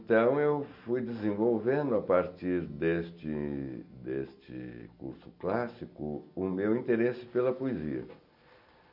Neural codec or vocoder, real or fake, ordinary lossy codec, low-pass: none; real; none; 5.4 kHz